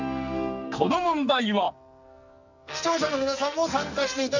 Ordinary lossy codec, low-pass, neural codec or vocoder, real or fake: none; 7.2 kHz; codec, 44.1 kHz, 2.6 kbps, SNAC; fake